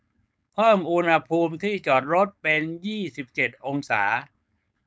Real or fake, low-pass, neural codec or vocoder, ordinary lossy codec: fake; none; codec, 16 kHz, 4.8 kbps, FACodec; none